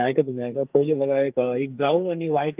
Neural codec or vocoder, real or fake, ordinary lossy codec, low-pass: codec, 44.1 kHz, 2.6 kbps, SNAC; fake; Opus, 24 kbps; 3.6 kHz